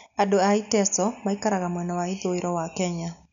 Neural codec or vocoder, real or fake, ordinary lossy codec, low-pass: none; real; none; 7.2 kHz